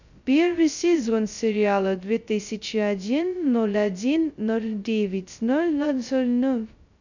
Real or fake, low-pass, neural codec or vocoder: fake; 7.2 kHz; codec, 16 kHz, 0.2 kbps, FocalCodec